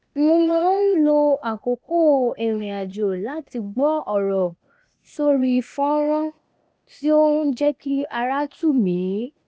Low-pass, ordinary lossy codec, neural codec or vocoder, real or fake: none; none; codec, 16 kHz, 0.8 kbps, ZipCodec; fake